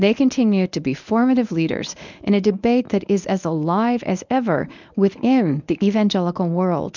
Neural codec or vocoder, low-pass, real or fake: codec, 24 kHz, 0.9 kbps, WavTokenizer, medium speech release version 1; 7.2 kHz; fake